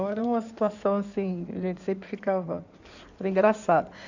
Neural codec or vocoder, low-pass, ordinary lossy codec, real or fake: codec, 16 kHz in and 24 kHz out, 2.2 kbps, FireRedTTS-2 codec; 7.2 kHz; none; fake